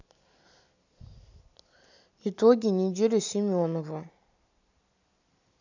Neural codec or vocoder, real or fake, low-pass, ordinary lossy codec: none; real; 7.2 kHz; none